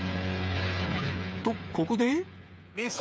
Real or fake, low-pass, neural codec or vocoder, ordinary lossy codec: fake; none; codec, 16 kHz, 8 kbps, FreqCodec, smaller model; none